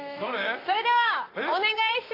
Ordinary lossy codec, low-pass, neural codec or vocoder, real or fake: AAC, 24 kbps; 5.4 kHz; vocoder, 44.1 kHz, 128 mel bands, Pupu-Vocoder; fake